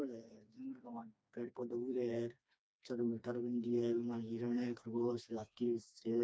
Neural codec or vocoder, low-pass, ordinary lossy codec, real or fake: codec, 16 kHz, 2 kbps, FreqCodec, smaller model; none; none; fake